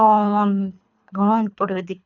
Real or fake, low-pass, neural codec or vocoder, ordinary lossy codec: fake; 7.2 kHz; codec, 24 kHz, 3 kbps, HILCodec; none